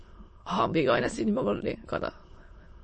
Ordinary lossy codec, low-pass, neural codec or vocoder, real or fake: MP3, 32 kbps; 9.9 kHz; autoencoder, 22.05 kHz, a latent of 192 numbers a frame, VITS, trained on many speakers; fake